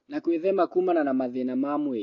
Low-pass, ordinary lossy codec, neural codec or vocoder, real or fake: 7.2 kHz; AAC, 32 kbps; none; real